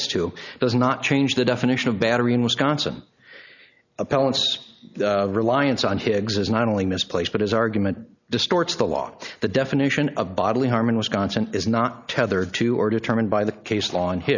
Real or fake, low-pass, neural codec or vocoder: real; 7.2 kHz; none